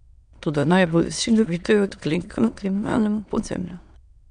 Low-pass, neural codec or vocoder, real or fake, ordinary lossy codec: 9.9 kHz; autoencoder, 22.05 kHz, a latent of 192 numbers a frame, VITS, trained on many speakers; fake; none